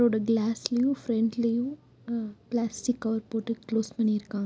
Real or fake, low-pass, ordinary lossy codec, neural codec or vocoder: real; none; none; none